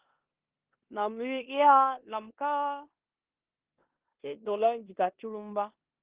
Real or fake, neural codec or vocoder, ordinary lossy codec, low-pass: fake; codec, 16 kHz in and 24 kHz out, 0.9 kbps, LongCat-Audio-Codec, four codebook decoder; Opus, 16 kbps; 3.6 kHz